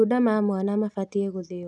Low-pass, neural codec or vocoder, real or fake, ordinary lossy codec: none; none; real; none